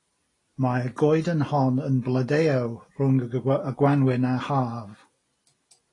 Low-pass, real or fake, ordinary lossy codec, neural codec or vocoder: 10.8 kHz; real; AAC, 32 kbps; none